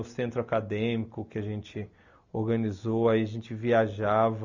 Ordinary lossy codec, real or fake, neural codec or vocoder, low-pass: none; real; none; 7.2 kHz